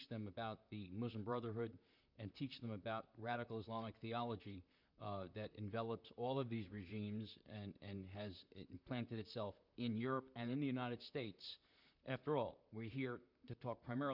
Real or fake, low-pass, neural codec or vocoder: fake; 5.4 kHz; vocoder, 44.1 kHz, 128 mel bands, Pupu-Vocoder